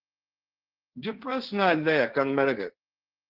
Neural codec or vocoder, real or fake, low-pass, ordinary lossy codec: codec, 16 kHz, 1.1 kbps, Voila-Tokenizer; fake; 5.4 kHz; Opus, 16 kbps